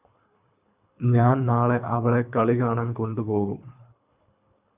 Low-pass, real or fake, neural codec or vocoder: 3.6 kHz; fake; codec, 24 kHz, 3 kbps, HILCodec